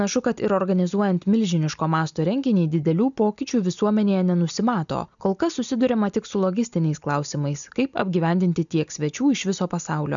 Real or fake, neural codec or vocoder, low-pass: real; none; 7.2 kHz